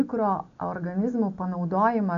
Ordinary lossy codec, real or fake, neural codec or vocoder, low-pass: MP3, 48 kbps; real; none; 7.2 kHz